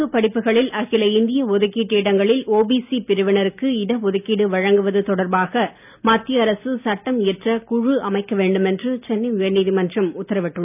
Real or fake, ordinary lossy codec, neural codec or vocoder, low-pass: real; none; none; 3.6 kHz